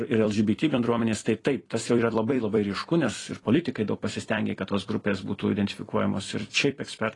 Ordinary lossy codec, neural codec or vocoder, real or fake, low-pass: AAC, 32 kbps; vocoder, 44.1 kHz, 128 mel bands every 256 samples, BigVGAN v2; fake; 10.8 kHz